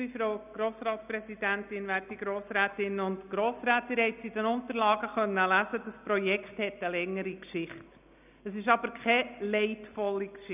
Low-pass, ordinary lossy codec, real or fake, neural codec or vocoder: 3.6 kHz; none; real; none